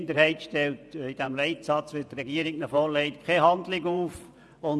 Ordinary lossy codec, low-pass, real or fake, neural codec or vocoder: none; none; fake; vocoder, 24 kHz, 100 mel bands, Vocos